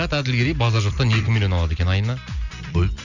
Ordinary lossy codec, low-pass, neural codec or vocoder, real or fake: none; 7.2 kHz; none; real